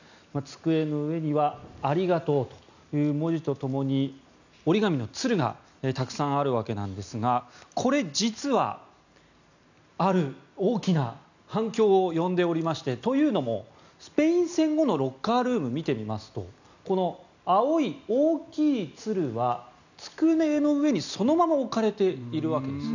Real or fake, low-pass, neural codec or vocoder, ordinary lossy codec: real; 7.2 kHz; none; none